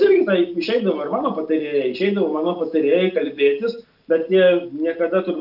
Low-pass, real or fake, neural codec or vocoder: 5.4 kHz; real; none